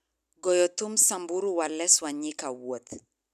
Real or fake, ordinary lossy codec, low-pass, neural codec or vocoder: real; none; 14.4 kHz; none